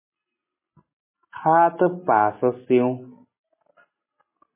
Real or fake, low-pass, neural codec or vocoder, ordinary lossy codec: real; 3.6 kHz; none; MP3, 16 kbps